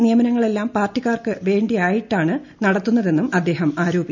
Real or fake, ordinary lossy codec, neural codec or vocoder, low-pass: real; none; none; 7.2 kHz